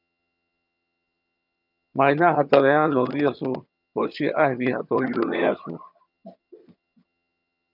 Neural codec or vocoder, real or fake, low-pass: vocoder, 22.05 kHz, 80 mel bands, HiFi-GAN; fake; 5.4 kHz